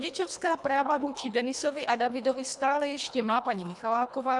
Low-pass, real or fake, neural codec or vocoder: 10.8 kHz; fake; codec, 24 kHz, 1.5 kbps, HILCodec